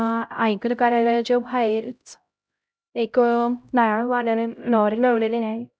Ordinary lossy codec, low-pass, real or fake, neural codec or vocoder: none; none; fake; codec, 16 kHz, 0.5 kbps, X-Codec, HuBERT features, trained on LibriSpeech